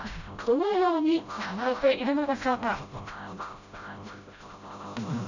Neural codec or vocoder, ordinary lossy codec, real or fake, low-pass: codec, 16 kHz, 0.5 kbps, FreqCodec, smaller model; none; fake; 7.2 kHz